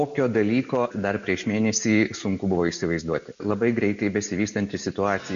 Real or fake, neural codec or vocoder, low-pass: real; none; 7.2 kHz